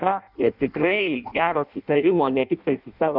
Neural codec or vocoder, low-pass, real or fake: codec, 16 kHz in and 24 kHz out, 0.6 kbps, FireRedTTS-2 codec; 5.4 kHz; fake